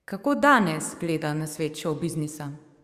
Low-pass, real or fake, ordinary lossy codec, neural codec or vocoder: 14.4 kHz; fake; Opus, 64 kbps; codec, 44.1 kHz, 7.8 kbps, DAC